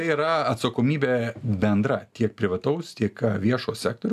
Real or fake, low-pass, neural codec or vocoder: real; 14.4 kHz; none